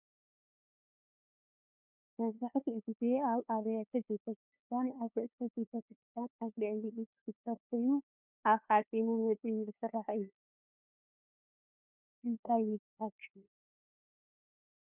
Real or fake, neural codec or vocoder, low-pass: fake; codec, 16 kHz, 2 kbps, FunCodec, trained on LibriTTS, 25 frames a second; 3.6 kHz